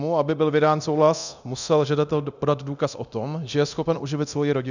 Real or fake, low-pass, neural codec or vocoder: fake; 7.2 kHz; codec, 24 kHz, 0.9 kbps, DualCodec